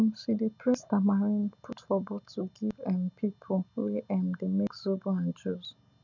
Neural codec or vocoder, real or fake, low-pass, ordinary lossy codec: none; real; 7.2 kHz; none